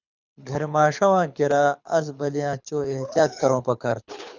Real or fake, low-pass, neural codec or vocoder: fake; 7.2 kHz; codec, 24 kHz, 6 kbps, HILCodec